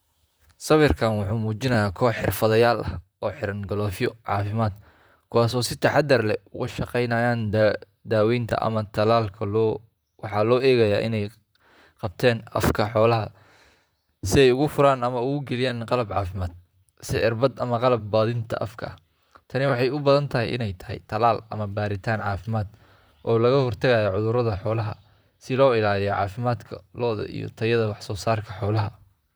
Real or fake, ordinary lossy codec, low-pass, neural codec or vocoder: fake; none; none; vocoder, 44.1 kHz, 128 mel bands, Pupu-Vocoder